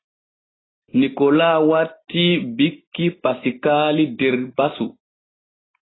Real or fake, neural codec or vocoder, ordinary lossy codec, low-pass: real; none; AAC, 16 kbps; 7.2 kHz